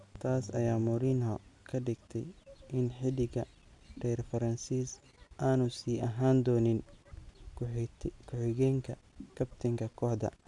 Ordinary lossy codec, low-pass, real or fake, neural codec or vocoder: none; 10.8 kHz; real; none